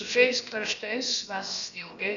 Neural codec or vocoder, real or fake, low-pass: codec, 16 kHz, about 1 kbps, DyCAST, with the encoder's durations; fake; 7.2 kHz